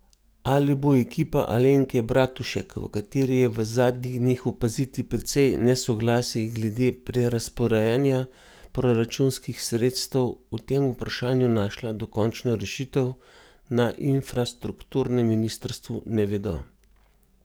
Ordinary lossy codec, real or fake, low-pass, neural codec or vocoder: none; fake; none; codec, 44.1 kHz, 7.8 kbps, DAC